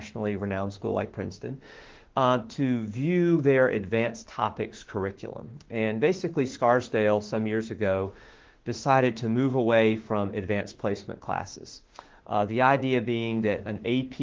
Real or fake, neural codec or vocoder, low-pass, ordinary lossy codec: fake; autoencoder, 48 kHz, 32 numbers a frame, DAC-VAE, trained on Japanese speech; 7.2 kHz; Opus, 16 kbps